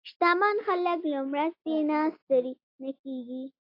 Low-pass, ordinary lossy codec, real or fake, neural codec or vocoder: 5.4 kHz; AAC, 32 kbps; real; none